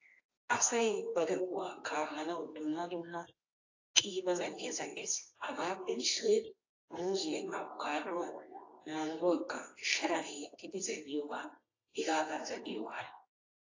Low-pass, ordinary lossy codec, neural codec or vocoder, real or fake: 7.2 kHz; AAC, 32 kbps; codec, 24 kHz, 0.9 kbps, WavTokenizer, medium music audio release; fake